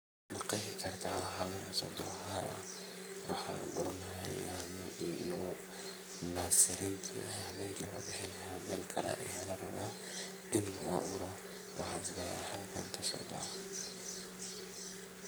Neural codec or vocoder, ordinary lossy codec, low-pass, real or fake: codec, 44.1 kHz, 3.4 kbps, Pupu-Codec; none; none; fake